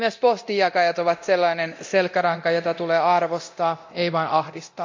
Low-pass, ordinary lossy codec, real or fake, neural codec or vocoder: 7.2 kHz; MP3, 64 kbps; fake; codec, 24 kHz, 0.9 kbps, DualCodec